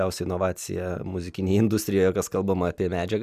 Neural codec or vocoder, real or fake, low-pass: none; real; 14.4 kHz